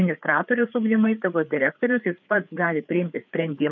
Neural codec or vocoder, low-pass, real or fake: codec, 16 kHz, 4 kbps, FreqCodec, larger model; 7.2 kHz; fake